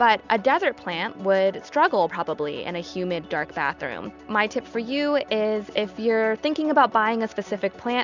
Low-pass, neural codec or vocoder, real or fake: 7.2 kHz; none; real